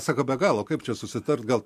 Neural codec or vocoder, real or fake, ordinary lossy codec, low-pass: vocoder, 44.1 kHz, 128 mel bands every 256 samples, BigVGAN v2; fake; MP3, 64 kbps; 14.4 kHz